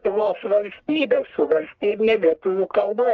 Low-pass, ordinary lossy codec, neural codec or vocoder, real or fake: 7.2 kHz; Opus, 24 kbps; codec, 44.1 kHz, 1.7 kbps, Pupu-Codec; fake